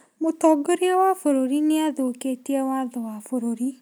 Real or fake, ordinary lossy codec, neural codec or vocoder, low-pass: real; none; none; none